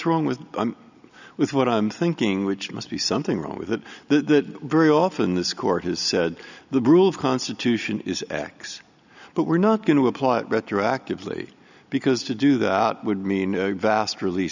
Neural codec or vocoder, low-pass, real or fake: none; 7.2 kHz; real